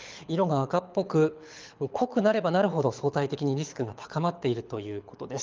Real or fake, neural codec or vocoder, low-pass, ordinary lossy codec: fake; codec, 24 kHz, 6 kbps, HILCodec; 7.2 kHz; Opus, 24 kbps